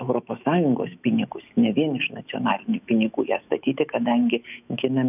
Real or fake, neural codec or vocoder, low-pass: fake; autoencoder, 48 kHz, 128 numbers a frame, DAC-VAE, trained on Japanese speech; 3.6 kHz